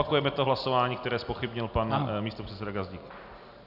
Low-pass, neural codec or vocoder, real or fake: 5.4 kHz; none; real